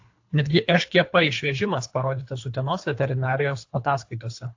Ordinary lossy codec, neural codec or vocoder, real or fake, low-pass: AAC, 48 kbps; codec, 24 kHz, 3 kbps, HILCodec; fake; 7.2 kHz